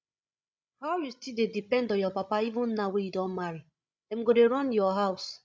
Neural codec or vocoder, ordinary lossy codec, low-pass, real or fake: codec, 16 kHz, 16 kbps, FreqCodec, larger model; none; none; fake